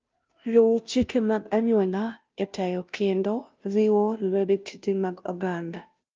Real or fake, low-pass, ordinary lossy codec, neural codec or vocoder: fake; 7.2 kHz; Opus, 24 kbps; codec, 16 kHz, 0.5 kbps, FunCodec, trained on Chinese and English, 25 frames a second